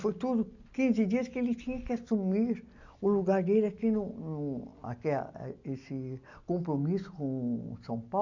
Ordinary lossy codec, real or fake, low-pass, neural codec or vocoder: none; real; 7.2 kHz; none